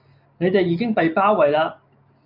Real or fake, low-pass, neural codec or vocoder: real; 5.4 kHz; none